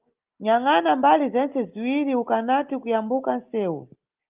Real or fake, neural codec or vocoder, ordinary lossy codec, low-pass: real; none; Opus, 32 kbps; 3.6 kHz